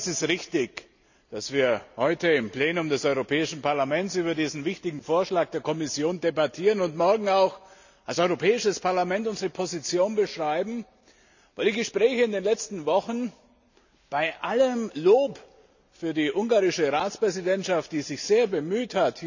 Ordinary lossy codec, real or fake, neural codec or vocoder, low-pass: none; real; none; 7.2 kHz